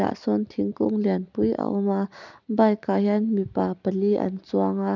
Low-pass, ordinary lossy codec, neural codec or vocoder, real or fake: 7.2 kHz; none; none; real